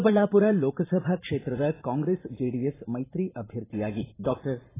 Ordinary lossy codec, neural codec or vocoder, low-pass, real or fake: AAC, 16 kbps; vocoder, 44.1 kHz, 128 mel bands every 512 samples, BigVGAN v2; 3.6 kHz; fake